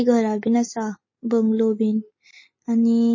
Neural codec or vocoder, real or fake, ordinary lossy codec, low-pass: none; real; MP3, 32 kbps; 7.2 kHz